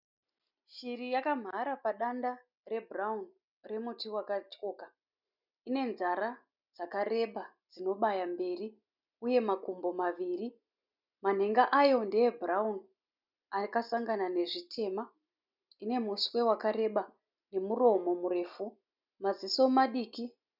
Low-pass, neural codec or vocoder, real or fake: 5.4 kHz; none; real